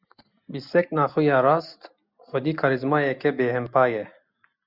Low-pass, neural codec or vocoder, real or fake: 5.4 kHz; none; real